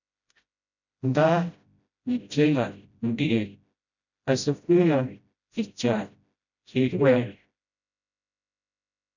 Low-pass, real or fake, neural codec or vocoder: 7.2 kHz; fake; codec, 16 kHz, 0.5 kbps, FreqCodec, smaller model